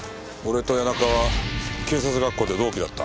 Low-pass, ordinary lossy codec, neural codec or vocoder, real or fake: none; none; none; real